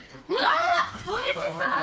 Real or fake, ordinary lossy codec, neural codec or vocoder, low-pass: fake; none; codec, 16 kHz, 2 kbps, FreqCodec, smaller model; none